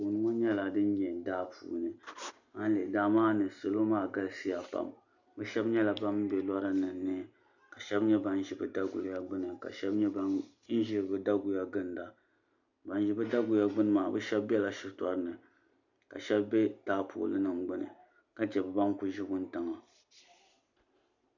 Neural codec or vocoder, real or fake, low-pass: none; real; 7.2 kHz